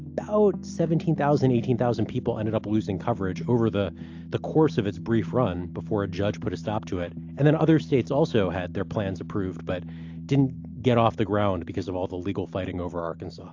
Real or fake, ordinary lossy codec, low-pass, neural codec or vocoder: real; AAC, 48 kbps; 7.2 kHz; none